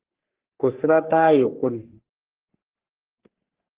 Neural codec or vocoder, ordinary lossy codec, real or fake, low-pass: codec, 44.1 kHz, 3.4 kbps, Pupu-Codec; Opus, 16 kbps; fake; 3.6 kHz